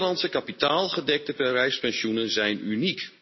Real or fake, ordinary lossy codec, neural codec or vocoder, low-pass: real; MP3, 24 kbps; none; 7.2 kHz